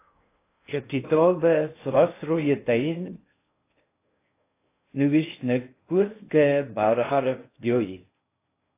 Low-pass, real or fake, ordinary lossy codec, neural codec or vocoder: 3.6 kHz; fake; AAC, 24 kbps; codec, 16 kHz in and 24 kHz out, 0.6 kbps, FocalCodec, streaming, 2048 codes